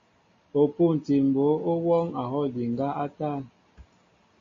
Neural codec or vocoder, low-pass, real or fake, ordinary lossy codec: none; 7.2 kHz; real; MP3, 32 kbps